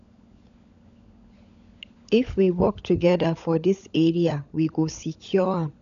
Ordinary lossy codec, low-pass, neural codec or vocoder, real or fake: none; 7.2 kHz; codec, 16 kHz, 16 kbps, FunCodec, trained on LibriTTS, 50 frames a second; fake